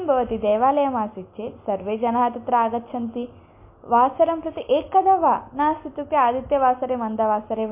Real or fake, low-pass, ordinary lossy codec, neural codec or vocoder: real; 3.6 kHz; MP3, 24 kbps; none